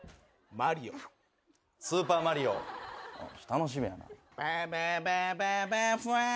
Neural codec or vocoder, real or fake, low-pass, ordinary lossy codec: none; real; none; none